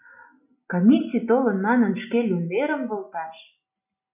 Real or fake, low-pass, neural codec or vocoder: real; 3.6 kHz; none